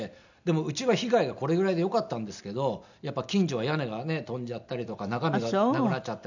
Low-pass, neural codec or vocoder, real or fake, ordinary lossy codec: 7.2 kHz; none; real; none